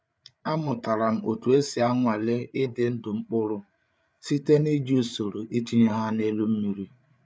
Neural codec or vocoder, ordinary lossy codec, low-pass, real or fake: codec, 16 kHz, 8 kbps, FreqCodec, larger model; none; none; fake